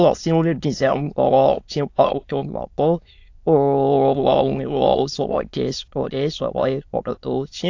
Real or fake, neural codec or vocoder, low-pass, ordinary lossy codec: fake; autoencoder, 22.05 kHz, a latent of 192 numbers a frame, VITS, trained on many speakers; 7.2 kHz; AAC, 48 kbps